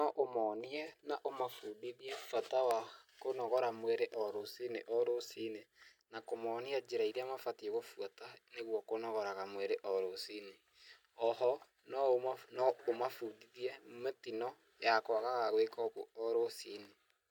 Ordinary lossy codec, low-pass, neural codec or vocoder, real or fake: none; 19.8 kHz; none; real